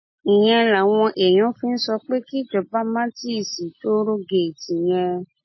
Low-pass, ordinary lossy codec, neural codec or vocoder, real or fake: 7.2 kHz; MP3, 24 kbps; none; real